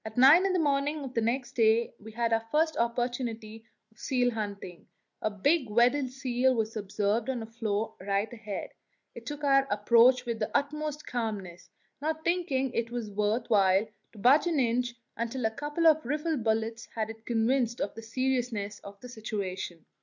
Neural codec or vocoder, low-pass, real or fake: none; 7.2 kHz; real